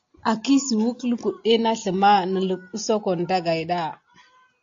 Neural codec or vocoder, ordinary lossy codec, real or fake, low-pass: none; AAC, 48 kbps; real; 7.2 kHz